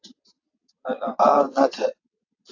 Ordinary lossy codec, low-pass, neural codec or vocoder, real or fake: AAC, 48 kbps; 7.2 kHz; vocoder, 22.05 kHz, 80 mel bands, WaveNeXt; fake